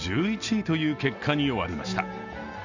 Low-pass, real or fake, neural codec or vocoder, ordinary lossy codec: 7.2 kHz; real; none; Opus, 64 kbps